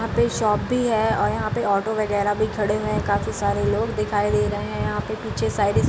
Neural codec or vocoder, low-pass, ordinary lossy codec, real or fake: none; none; none; real